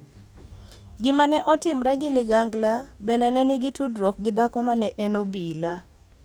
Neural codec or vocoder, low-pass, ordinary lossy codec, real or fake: codec, 44.1 kHz, 2.6 kbps, DAC; none; none; fake